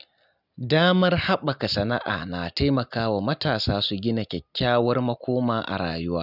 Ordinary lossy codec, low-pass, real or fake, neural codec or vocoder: none; 5.4 kHz; real; none